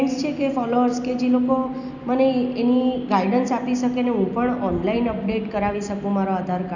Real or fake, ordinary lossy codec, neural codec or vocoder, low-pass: real; none; none; 7.2 kHz